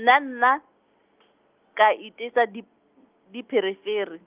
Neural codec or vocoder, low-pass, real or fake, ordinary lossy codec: none; 3.6 kHz; real; Opus, 24 kbps